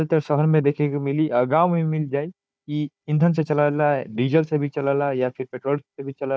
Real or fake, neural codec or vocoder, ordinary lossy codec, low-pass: fake; codec, 16 kHz, 4 kbps, FunCodec, trained on Chinese and English, 50 frames a second; none; none